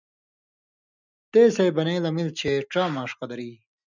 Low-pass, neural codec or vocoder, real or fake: 7.2 kHz; none; real